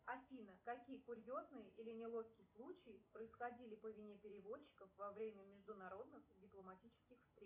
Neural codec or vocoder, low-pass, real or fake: none; 3.6 kHz; real